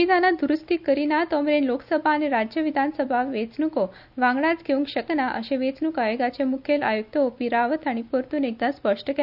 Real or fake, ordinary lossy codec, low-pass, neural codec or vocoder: real; none; 5.4 kHz; none